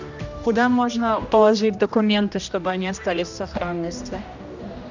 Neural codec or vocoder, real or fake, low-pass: codec, 16 kHz, 1 kbps, X-Codec, HuBERT features, trained on general audio; fake; 7.2 kHz